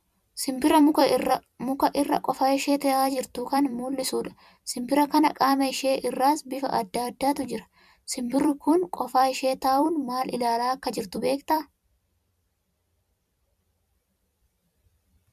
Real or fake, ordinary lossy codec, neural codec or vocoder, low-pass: fake; MP3, 96 kbps; vocoder, 44.1 kHz, 128 mel bands every 256 samples, BigVGAN v2; 14.4 kHz